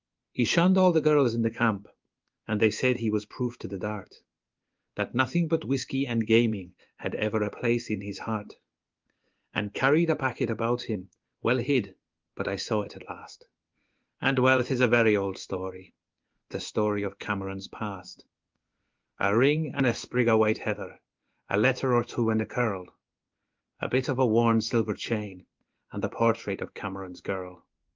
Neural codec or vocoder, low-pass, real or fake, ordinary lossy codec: codec, 16 kHz in and 24 kHz out, 1 kbps, XY-Tokenizer; 7.2 kHz; fake; Opus, 32 kbps